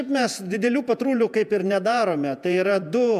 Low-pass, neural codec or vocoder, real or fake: 14.4 kHz; vocoder, 48 kHz, 128 mel bands, Vocos; fake